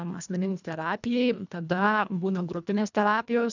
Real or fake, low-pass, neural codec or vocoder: fake; 7.2 kHz; codec, 24 kHz, 1.5 kbps, HILCodec